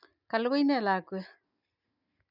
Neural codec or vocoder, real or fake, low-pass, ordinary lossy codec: none; real; 5.4 kHz; none